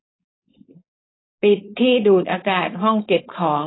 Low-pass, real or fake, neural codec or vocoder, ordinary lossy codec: 7.2 kHz; fake; codec, 16 kHz, 4.8 kbps, FACodec; AAC, 16 kbps